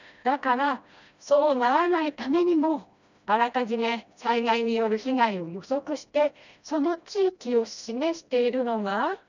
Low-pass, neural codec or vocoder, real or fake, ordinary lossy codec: 7.2 kHz; codec, 16 kHz, 1 kbps, FreqCodec, smaller model; fake; none